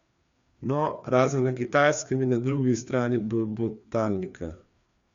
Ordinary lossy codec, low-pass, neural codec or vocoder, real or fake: Opus, 64 kbps; 7.2 kHz; codec, 16 kHz, 2 kbps, FreqCodec, larger model; fake